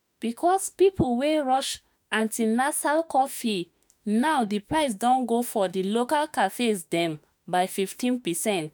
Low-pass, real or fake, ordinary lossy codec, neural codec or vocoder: none; fake; none; autoencoder, 48 kHz, 32 numbers a frame, DAC-VAE, trained on Japanese speech